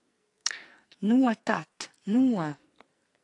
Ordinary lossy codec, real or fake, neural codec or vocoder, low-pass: AAC, 48 kbps; fake; codec, 44.1 kHz, 2.6 kbps, SNAC; 10.8 kHz